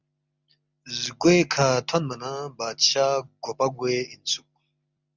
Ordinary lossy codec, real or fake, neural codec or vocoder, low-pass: Opus, 64 kbps; real; none; 7.2 kHz